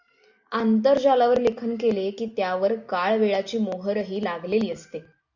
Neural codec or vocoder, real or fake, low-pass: none; real; 7.2 kHz